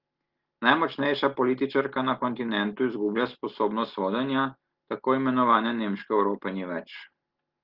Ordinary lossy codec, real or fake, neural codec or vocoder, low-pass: Opus, 16 kbps; real; none; 5.4 kHz